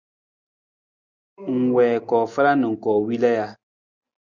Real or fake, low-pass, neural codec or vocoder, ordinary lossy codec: real; 7.2 kHz; none; Opus, 64 kbps